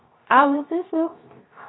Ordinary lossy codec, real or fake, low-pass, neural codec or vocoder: AAC, 16 kbps; fake; 7.2 kHz; codec, 16 kHz, 0.3 kbps, FocalCodec